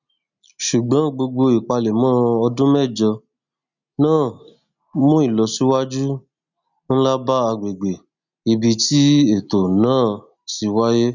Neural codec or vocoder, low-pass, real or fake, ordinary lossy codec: none; 7.2 kHz; real; none